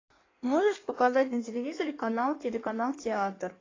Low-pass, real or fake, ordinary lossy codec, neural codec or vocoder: 7.2 kHz; fake; AAC, 32 kbps; codec, 16 kHz in and 24 kHz out, 1.1 kbps, FireRedTTS-2 codec